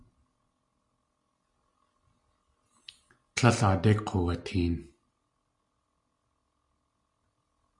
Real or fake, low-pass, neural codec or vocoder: real; 10.8 kHz; none